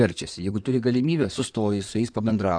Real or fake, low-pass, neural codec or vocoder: fake; 9.9 kHz; codec, 16 kHz in and 24 kHz out, 2.2 kbps, FireRedTTS-2 codec